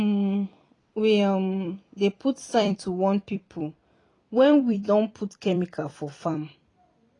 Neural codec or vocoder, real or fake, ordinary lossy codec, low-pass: vocoder, 44.1 kHz, 128 mel bands every 256 samples, BigVGAN v2; fake; AAC, 32 kbps; 10.8 kHz